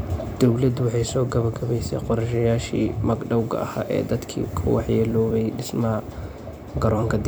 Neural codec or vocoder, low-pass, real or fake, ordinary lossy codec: vocoder, 44.1 kHz, 128 mel bands every 256 samples, BigVGAN v2; none; fake; none